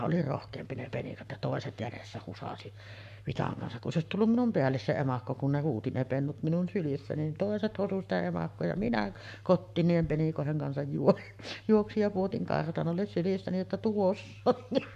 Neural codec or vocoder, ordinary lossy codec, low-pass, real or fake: codec, 44.1 kHz, 7.8 kbps, Pupu-Codec; none; 14.4 kHz; fake